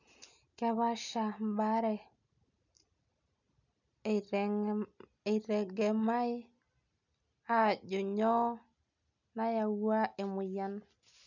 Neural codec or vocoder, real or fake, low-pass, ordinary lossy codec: none; real; 7.2 kHz; none